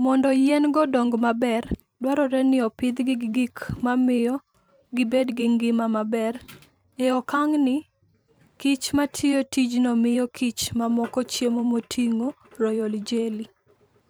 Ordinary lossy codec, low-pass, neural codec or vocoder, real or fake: none; none; vocoder, 44.1 kHz, 128 mel bands every 512 samples, BigVGAN v2; fake